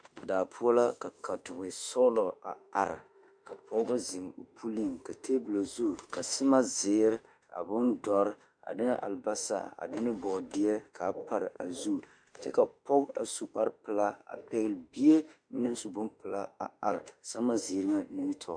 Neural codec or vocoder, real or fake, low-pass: autoencoder, 48 kHz, 32 numbers a frame, DAC-VAE, trained on Japanese speech; fake; 9.9 kHz